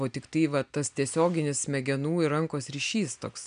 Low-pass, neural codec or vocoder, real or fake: 9.9 kHz; none; real